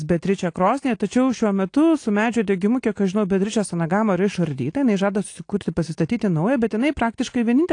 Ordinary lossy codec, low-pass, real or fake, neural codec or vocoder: AAC, 48 kbps; 9.9 kHz; real; none